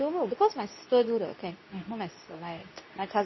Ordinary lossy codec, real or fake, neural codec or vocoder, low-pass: MP3, 24 kbps; fake; codec, 24 kHz, 0.9 kbps, WavTokenizer, medium speech release version 2; 7.2 kHz